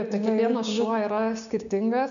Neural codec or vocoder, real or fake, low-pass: codec, 16 kHz, 6 kbps, DAC; fake; 7.2 kHz